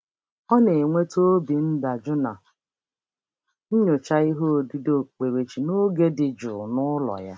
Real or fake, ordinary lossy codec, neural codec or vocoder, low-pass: real; none; none; none